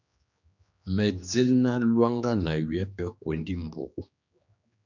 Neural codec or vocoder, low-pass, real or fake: codec, 16 kHz, 2 kbps, X-Codec, HuBERT features, trained on general audio; 7.2 kHz; fake